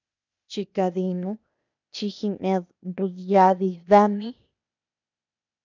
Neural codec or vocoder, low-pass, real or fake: codec, 16 kHz, 0.8 kbps, ZipCodec; 7.2 kHz; fake